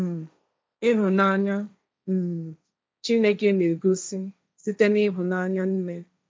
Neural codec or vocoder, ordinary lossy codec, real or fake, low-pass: codec, 16 kHz, 1.1 kbps, Voila-Tokenizer; none; fake; none